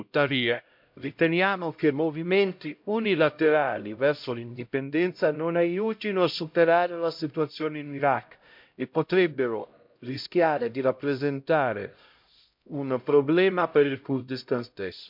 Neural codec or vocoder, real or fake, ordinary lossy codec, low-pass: codec, 16 kHz, 0.5 kbps, X-Codec, HuBERT features, trained on LibriSpeech; fake; MP3, 48 kbps; 5.4 kHz